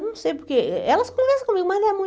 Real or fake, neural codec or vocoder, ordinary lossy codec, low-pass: real; none; none; none